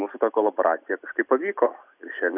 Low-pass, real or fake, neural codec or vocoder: 3.6 kHz; real; none